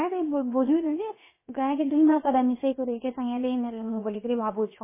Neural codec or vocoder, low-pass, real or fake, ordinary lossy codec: codec, 16 kHz, about 1 kbps, DyCAST, with the encoder's durations; 3.6 kHz; fake; MP3, 16 kbps